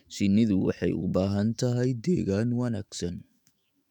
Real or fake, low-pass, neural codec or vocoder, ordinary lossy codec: fake; 19.8 kHz; autoencoder, 48 kHz, 128 numbers a frame, DAC-VAE, trained on Japanese speech; none